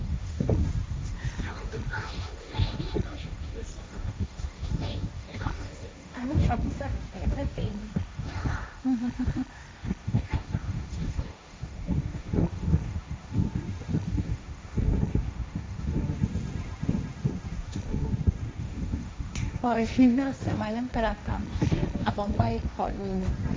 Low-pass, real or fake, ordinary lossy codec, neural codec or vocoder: none; fake; none; codec, 16 kHz, 1.1 kbps, Voila-Tokenizer